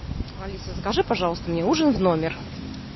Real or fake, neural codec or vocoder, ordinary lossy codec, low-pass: real; none; MP3, 24 kbps; 7.2 kHz